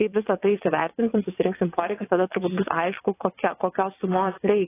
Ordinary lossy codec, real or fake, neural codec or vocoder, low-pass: AAC, 16 kbps; fake; vocoder, 22.05 kHz, 80 mel bands, WaveNeXt; 3.6 kHz